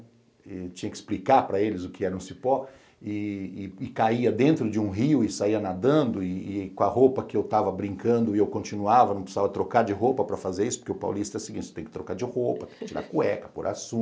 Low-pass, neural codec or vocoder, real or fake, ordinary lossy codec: none; none; real; none